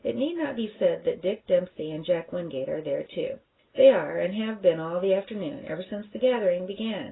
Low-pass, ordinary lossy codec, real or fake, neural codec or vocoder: 7.2 kHz; AAC, 16 kbps; real; none